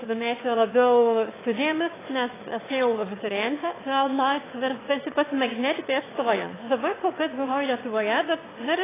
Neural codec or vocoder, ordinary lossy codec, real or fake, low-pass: autoencoder, 22.05 kHz, a latent of 192 numbers a frame, VITS, trained on one speaker; AAC, 16 kbps; fake; 3.6 kHz